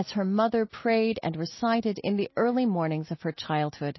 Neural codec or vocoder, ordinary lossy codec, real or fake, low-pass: none; MP3, 24 kbps; real; 7.2 kHz